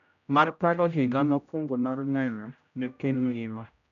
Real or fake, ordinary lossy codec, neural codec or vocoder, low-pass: fake; none; codec, 16 kHz, 0.5 kbps, X-Codec, HuBERT features, trained on general audio; 7.2 kHz